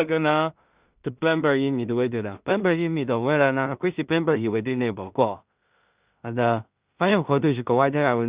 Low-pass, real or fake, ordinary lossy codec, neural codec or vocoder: 3.6 kHz; fake; Opus, 64 kbps; codec, 16 kHz in and 24 kHz out, 0.4 kbps, LongCat-Audio-Codec, two codebook decoder